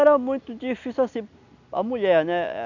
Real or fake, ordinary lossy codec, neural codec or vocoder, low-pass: real; none; none; 7.2 kHz